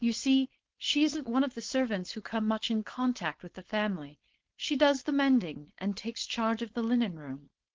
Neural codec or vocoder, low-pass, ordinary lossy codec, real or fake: codec, 16 kHz, 4.8 kbps, FACodec; 7.2 kHz; Opus, 16 kbps; fake